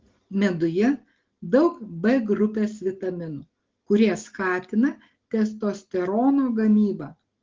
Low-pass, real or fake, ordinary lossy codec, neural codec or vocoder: 7.2 kHz; real; Opus, 16 kbps; none